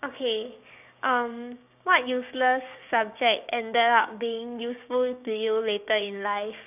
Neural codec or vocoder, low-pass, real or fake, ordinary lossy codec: codec, 16 kHz, 6 kbps, DAC; 3.6 kHz; fake; none